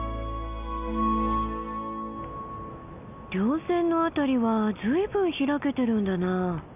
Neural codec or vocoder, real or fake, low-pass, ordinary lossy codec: none; real; 3.6 kHz; none